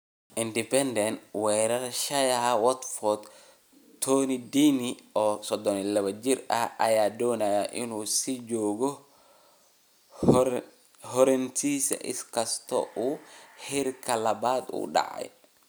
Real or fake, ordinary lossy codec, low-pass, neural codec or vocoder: real; none; none; none